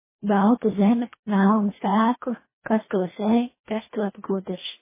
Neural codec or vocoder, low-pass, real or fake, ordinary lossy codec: codec, 24 kHz, 1.5 kbps, HILCodec; 3.6 kHz; fake; MP3, 16 kbps